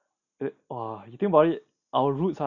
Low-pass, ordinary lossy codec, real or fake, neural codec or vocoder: 7.2 kHz; none; real; none